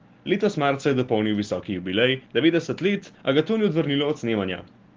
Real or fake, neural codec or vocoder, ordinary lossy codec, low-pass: real; none; Opus, 16 kbps; 7.2 kHz